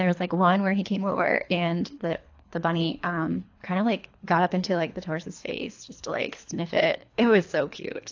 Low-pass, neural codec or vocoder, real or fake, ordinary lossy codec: 7.2 kHz; codec, 24 kHz, 3 kbps, HILCodec; fake; AAC, 48 kbps